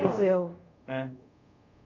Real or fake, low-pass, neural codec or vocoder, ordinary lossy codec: fake; 7.2 kHz; codec, 24 kHz, 0.5 kbps, DualCodec; MP3, 64 kbps